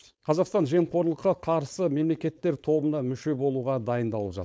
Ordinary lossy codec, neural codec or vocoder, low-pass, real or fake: none; codec, 16 kHz, 4.8 kbps, FACodec; none; fake